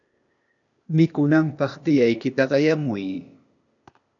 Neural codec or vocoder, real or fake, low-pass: codec, 16 kHz, 0.8 kbps, ZipCodec; fake; 7.2 kHz